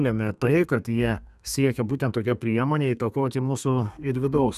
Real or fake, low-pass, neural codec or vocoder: fake; 14.4 kHz; codec, 32 kHz, 1.9 kbps, SNAC